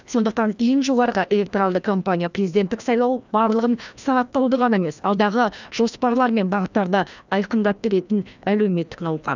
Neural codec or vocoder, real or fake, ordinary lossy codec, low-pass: codec, 16 kHz, 1 kbps, FreqCodec, larger model; fake; none; 7.2 kHz